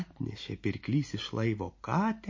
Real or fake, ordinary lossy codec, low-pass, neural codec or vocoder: real; MP3, 32 kbps; 7.2 kHz; none